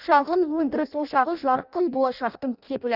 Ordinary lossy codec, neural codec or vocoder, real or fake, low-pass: none; codec, 16 kHz in and 24 kHz out, 0.6 kbps, FireRedTTS-2 codec; fake; 5.4 kHz